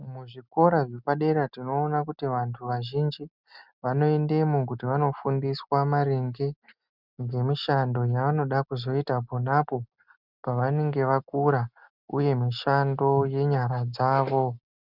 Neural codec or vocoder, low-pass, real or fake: none; 5.4 kHz; real